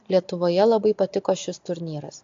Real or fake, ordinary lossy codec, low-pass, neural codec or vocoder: real; AAC, 48 kbps; 7.2 kHz; none